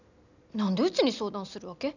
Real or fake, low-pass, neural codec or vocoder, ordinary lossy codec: real; 7.2 kHz; none; none